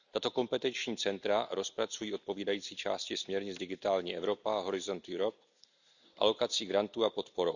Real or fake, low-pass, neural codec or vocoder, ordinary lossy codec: real; 7.2 kHz; none; none